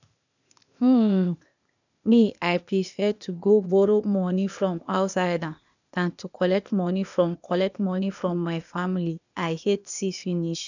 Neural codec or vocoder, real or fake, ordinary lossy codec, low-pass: codec, 16 kHz, 0.8 kbps, ZipCodec; fake; none; 7.2 kHz